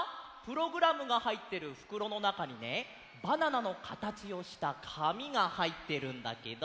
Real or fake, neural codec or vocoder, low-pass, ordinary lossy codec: real; none; none; none